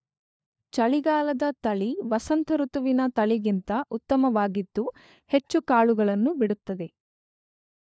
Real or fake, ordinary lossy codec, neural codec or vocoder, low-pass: fake; none; codec, 16 kHz, 4 kbps, FunCodec, trained on LibriTTS, 50 frames a second; none